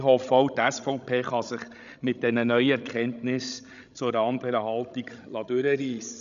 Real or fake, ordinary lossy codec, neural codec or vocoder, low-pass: fake; MP3, 96 kbps; codec, 16 kHz, 16 kbps, FreqCodec, larger model; 7.2 kHz